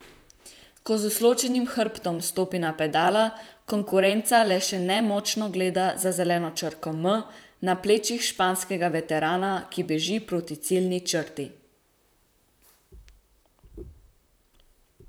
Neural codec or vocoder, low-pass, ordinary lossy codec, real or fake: vocoder, 44.1 kHz, 128 mel bands, Pupu-Vocoder; none; none; fake